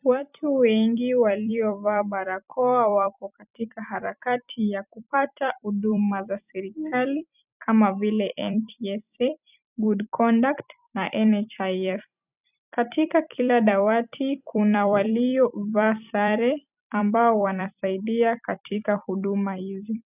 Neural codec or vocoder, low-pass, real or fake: vocoder, 44.1 kHz, 128 mel bands every 256 samples, BigVGAN v2; 3.6 kHz; fake